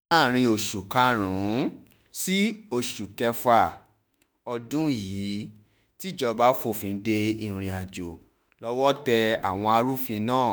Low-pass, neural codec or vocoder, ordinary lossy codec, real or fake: none; autoencoder, 48 kHz, 32 numbers a frame, DAC-VAE, trained on Japanese speech; none; fake